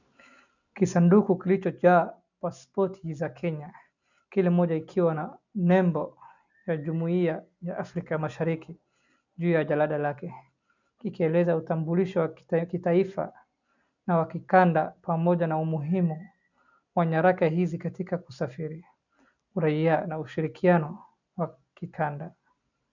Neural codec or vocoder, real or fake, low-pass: none; real; 7.2 kHz